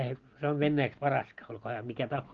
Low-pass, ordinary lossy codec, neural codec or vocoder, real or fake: 7.2 kHz; Opus, 16 kbps; none; real